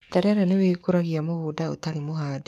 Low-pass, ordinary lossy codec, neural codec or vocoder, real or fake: 14.4 kHz; none; codec, 44.1 kHz, 7.8 kbps, DAC; fake